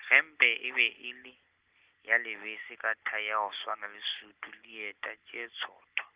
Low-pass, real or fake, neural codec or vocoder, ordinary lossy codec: 3.6 kHz; real; none; Opus, 16 kbps